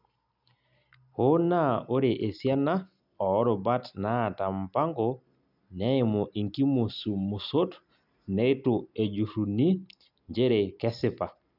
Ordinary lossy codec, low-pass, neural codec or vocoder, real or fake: none; 5.4 kHz; none; real